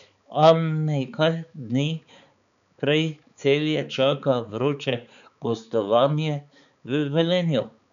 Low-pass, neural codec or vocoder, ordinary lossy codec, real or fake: 7.2 kHz; codec, 16 kHz, 4 kbps, X-Codec, HuBERT features, trained on balanced general audio; none; fake